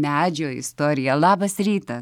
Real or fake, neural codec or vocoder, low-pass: real; none; 19.8 kHz